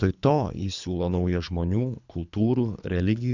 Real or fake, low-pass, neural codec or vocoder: fake; 7.2 kHz; codec, 24 kHz, 3 kbps, HILCodec